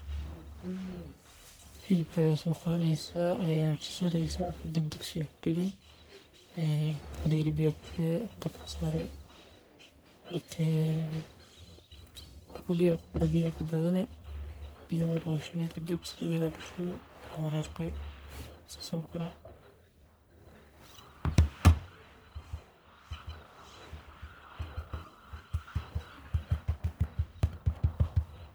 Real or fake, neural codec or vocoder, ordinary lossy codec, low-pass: fake; codec, 44.1 kHz, 1.7 kbps, Pupu-Codec; none; none